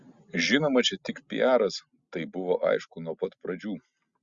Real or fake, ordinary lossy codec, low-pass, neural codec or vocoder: real; Opus, 64 kbps; 7.2 kHz; none